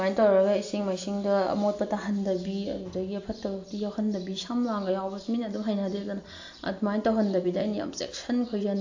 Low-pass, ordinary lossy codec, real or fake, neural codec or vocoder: 7.2 kHz; AAC, 48 kbps; real; none